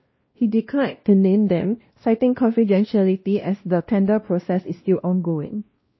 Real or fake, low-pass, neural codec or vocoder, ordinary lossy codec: fake; 7.2 kHz; codec, 16 kHz, 1 kbps, X-Codec, WavLM features, trained on Multilingual LibriSpeech; MP3, 24 kbps